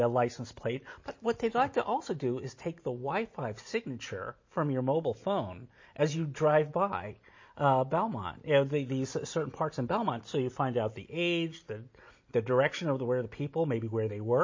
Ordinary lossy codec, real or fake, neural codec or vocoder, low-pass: MP3, 32 kbps; real; none; 7.2 kHz